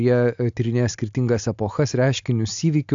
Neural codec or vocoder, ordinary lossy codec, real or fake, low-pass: none; AAC, 64 kbps; real; 7.2 kHz